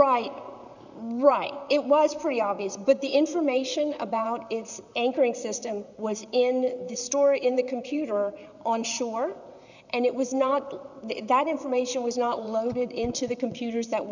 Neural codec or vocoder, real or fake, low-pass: vocoder, 44.1 kHz, 128 mel bands, Pupu-Vocoder; fake; 7.2 kHz